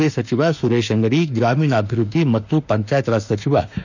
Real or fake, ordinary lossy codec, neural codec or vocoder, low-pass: fake; none; autoencoder, 48 kHz, 32 numbers a frame, DAC-VAE, trained on Japanese speech; 7.2 kHz